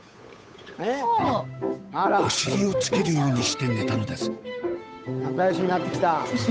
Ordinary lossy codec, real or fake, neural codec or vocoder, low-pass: none; fake; codec, 16 kHz, 8 kbps, FunCodec, trained on Chinese and English, 25 frames a second; none